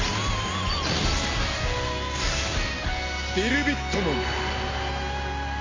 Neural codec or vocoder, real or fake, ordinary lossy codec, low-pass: none; real; MP3, 64 kbps; 7.2 kHz